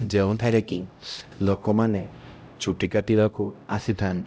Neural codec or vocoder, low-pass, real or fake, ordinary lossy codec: codec, 16 kHz, 0.5 kbps, X-Codec, HuBERT features, trained on LibriSpeech; none; fake; none